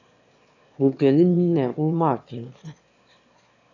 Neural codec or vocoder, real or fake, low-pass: autoencoder, 22.05 kHz, a latent of 192 numbers a frame, VITS, trained on one speaker; fake; 7.2 kHz